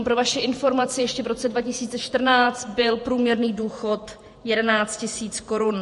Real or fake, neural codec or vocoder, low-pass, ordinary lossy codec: fake; vocoder, 48 kHz, 128 mel bands, Vocos; 14.4 kHz; MP3, 48 kbps